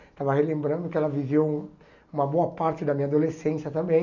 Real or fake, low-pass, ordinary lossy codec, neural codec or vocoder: real; 7.2 kHz; none; none